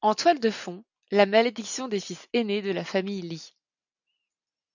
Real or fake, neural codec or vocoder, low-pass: real; none; 7.2 kHz